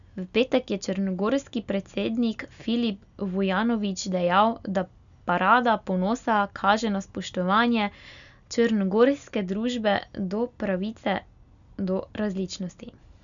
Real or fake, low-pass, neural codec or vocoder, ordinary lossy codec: real; 7.2 kHz; none; none